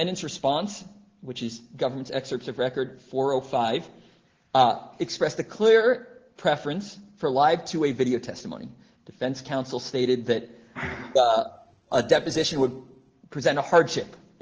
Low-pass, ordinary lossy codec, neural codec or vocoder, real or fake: 7.2 kHz; Opus, 16 kbps; none; real